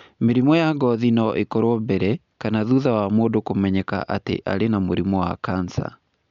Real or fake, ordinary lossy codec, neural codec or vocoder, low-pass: real; MP3, 64 kbps; none; 7.2 kHz